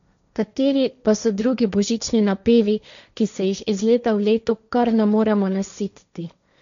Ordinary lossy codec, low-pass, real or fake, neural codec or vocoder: none; 7.2 kHz; fake; codec, 16 kHz, 1.1 kbps, Voila-Tokenizer